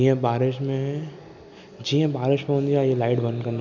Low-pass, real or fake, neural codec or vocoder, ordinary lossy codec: 7.2 kHz; real; none; none